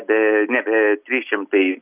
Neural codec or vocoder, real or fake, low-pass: none; real; 3.6 kHz